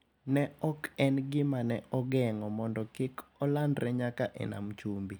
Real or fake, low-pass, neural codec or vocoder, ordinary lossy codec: real; none; none; none